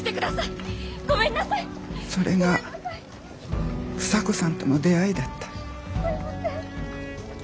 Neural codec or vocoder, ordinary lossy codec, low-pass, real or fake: none; none; none; real